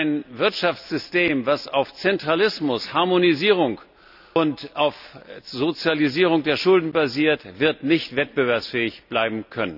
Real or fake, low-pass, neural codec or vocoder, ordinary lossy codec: real; 5.4 kHz; none; none